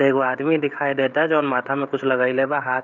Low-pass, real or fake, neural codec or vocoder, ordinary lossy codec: 7.2 kHz; fake; codec, 16 kHz, 16 kbps, FreqCodec, smaller model; none